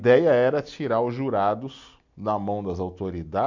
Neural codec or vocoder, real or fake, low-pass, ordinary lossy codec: none; real; 7.2 kHz; none